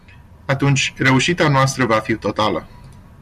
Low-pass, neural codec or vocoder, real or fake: 14.4 kHz; none; real